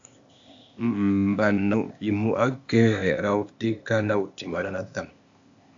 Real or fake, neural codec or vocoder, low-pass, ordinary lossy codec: fake; codec, 16 kHz, 0.8 kbps, ZipCodec; 7.2 kHz; AAC, 64 kbps